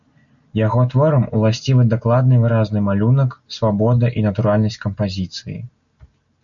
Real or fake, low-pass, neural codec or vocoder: real; 7.2 kHz; none